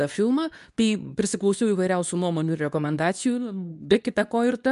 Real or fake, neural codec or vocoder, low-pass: fake; codec, 24 kHz, 0.9 kbps, WavTokenizer, medium speech release version 2; 10.8 kHz